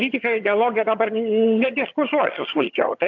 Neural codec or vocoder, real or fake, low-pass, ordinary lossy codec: vocoder, 22.05 kHz, 80 mel bands, HiFi-GAN; fake; 7.2 kHz; AAC, 48 kbps